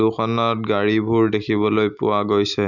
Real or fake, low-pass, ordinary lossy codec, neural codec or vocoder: real; 7.2 kHz; none; none